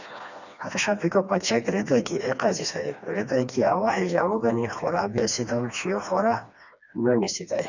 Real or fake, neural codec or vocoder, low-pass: fake; codec, 16 kHz, 2 kbps, FreqCodec, smaller model; 7.2 kHz